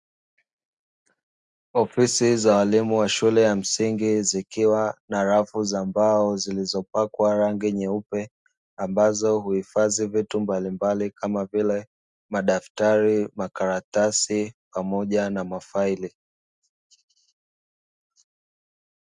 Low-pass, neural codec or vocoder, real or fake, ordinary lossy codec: 10.8 kHz; none; real; Opus, 64 kbps